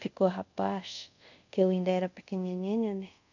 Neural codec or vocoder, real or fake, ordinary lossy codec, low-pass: codec, 24 kHz, 0.5 kbps, DualCodec; fake; none; 7.2 kHz